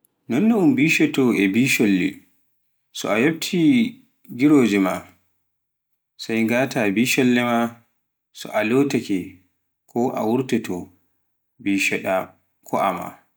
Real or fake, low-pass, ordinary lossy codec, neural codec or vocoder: real; none; none; none